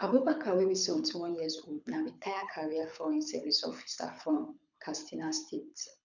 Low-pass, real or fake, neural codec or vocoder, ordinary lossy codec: 7.2 kHz; fake; codec, 24 kHz, 6 kbps, HILCodec; none